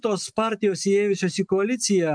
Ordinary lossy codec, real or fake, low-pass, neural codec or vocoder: MP3, 96 kbps; real; 9.9 kHz; none